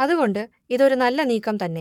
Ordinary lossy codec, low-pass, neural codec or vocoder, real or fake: none; 19.8 kHz; codec, 44.1 kHz, 7.8 kbps, Pupu-Codec; fake